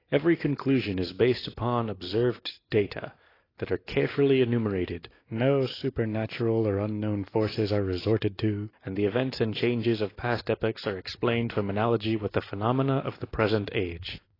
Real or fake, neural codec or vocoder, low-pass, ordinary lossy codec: real; none; 5.4 kHz; AAC, 24 kbps